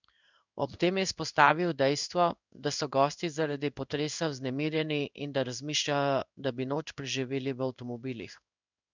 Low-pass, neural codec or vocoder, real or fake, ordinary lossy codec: 7.2 kHz; codec, 16 kHz in and 24 kHz out, 1 kbps, XY-Tokenizer; fake; none